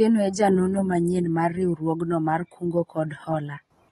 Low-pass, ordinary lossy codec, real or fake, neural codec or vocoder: 14.4 kHz; AAC, 32 kbps; real; none